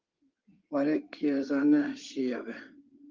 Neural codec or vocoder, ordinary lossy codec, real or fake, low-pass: codec, 16 kHz in and 24 kHz out, 2.2 kbps, FireRedTTS-2 codec; Opus, 32 kbps; fake; 7.2 kHz